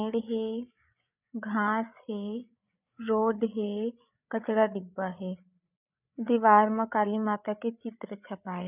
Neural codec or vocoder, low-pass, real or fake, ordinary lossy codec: codec, 16 kHz, 8 kbps, FreqCodec, larger model; 3.6 kHz; fake; none